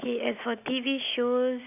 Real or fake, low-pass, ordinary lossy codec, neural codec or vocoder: real; 3.6 kHz; none; none